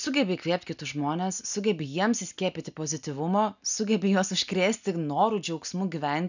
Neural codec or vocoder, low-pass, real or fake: none; 7.2 kHz; real